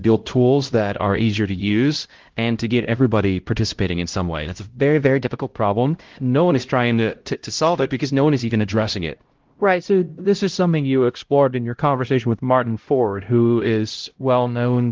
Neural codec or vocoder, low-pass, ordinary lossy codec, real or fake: codec, 16 kHz, 0.5 kbps, X-Codec, HuBERT features, trained on LibriSpeech; 7.2 kHz; Opus, 16 kbps; fake